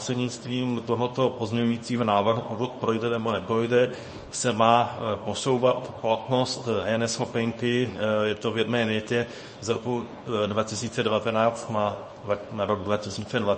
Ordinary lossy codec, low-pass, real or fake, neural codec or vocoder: MP3, 32 kbps; 10.8 kHz; fake; codec, 24 kHz, 0.9 kbps, WavTokenizer, small release